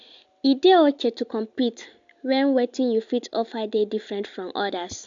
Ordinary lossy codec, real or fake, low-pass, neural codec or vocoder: AAC, 64 kbps; real; 7.2 kHz; none